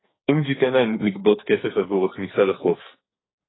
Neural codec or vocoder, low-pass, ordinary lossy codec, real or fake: codec, 44.1 kHz, 2.6 kbps, SNAC; 7.2 kHz; AAC, 16 kbps; fake